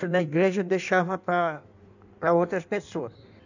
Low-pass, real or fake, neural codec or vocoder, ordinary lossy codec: 7.2 kHz; fake; codec, 16 kHz in and 24 kHz out, 1.1 kbps, FireRedTTS-2 codec; none